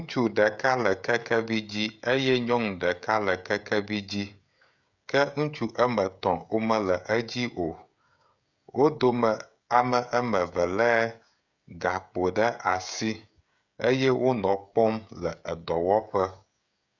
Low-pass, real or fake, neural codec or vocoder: 7.2 kHz; fake; codec, 16 kHz, 16 kbps, FreqCodec, smaller model